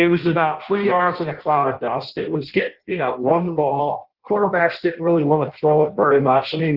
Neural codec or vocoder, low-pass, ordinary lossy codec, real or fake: codec, 16 kHz in and 24 kHz out, 0.6 kbps, FireRedTTS-2 codec; 5.4 kHz; Opus, 16 kbps; fake